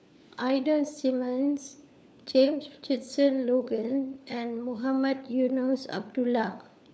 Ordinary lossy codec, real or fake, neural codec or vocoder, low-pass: none; fake; codec, 16 kHz, 4 kbps, FunCodec, trained on LibriTTS, 50 frames a second; none